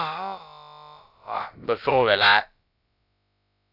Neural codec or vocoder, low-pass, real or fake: codec, 16 kHz, about 1 kbps, DyCAST, with the encoder's durations; 5.4 kHz; fake